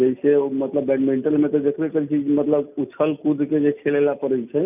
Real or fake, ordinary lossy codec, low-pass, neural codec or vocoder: real; none; 3.6 kHz; none